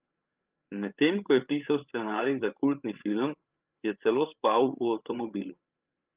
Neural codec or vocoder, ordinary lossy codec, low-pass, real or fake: codec, 16 kHz, 8 kbps, FreqCodec, larger model; Opus, 32 kbps; 3.6 kHz; fake